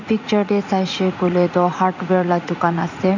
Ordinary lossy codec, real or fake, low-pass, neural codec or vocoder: none; fake; 7.2 kHz; vocoder, 44.1 kHz, 80 mel bands, Vocos